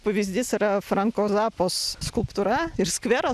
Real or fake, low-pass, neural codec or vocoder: real; 14.4 kHz; none